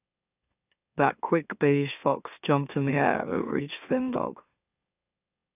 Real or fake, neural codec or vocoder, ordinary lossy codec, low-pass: fake; autoencoder, 44.1 kHz, a latent of 192 numbers a frame, MeloTTS; none; 3.6 kHz